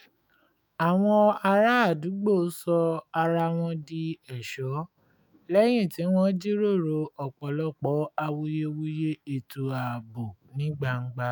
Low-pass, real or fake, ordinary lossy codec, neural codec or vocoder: none; fake; none; autoencoder, 48 kHz, 128 numbers a frame, DAC-VAE, trained on Japanese speech